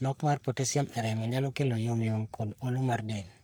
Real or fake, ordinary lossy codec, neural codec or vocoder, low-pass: fake; none; codec, 44.1 kHz, 3.4 kbps, Pupu-Codec; none